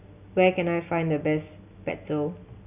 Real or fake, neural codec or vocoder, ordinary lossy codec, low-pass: real; none; none; 3.6 kHz